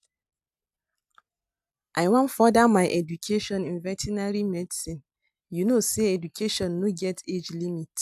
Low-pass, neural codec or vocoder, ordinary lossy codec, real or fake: 14.4 kHz; none; AAC, 96 kbps; real